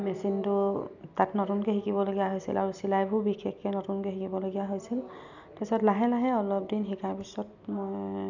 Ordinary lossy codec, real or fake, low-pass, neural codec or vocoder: none; real; 7.2 kHz; none